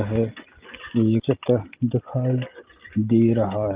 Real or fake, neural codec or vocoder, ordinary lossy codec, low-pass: real; none; Opus, 32 kbps; 3.6 kHz